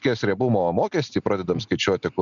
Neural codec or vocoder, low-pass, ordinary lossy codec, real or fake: none; 7.2 kHz; MP3, 96 kbps; real